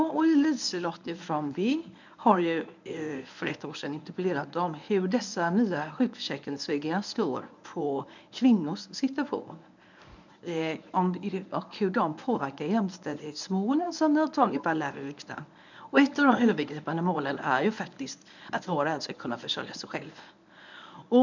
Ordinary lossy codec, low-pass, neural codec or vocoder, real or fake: none; 7.2 kHz; codec, 24 kHz, 0.9 kbps, WavTokenizer, medium speech release version 1; fake